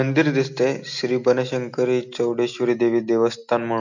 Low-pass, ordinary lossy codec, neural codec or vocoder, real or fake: 7.2 kHz; none; none; real